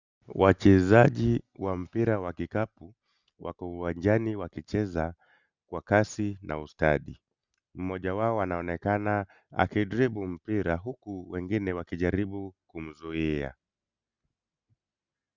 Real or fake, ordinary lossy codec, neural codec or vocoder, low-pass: real; Opus, 64 kbps; none; 7.2 kHz